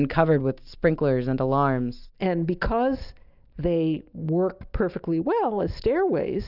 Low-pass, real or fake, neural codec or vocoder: 5.4 kHz; real; none